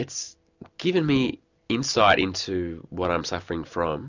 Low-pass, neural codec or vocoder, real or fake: 7.2 kHz; none; real